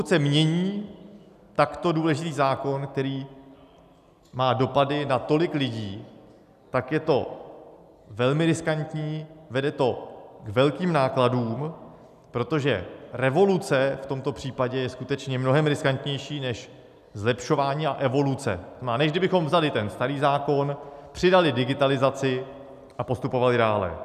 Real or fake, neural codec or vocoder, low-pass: real; none; 14.4 kHz